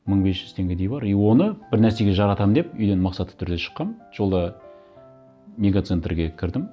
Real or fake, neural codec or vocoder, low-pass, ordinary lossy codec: real; none; none; none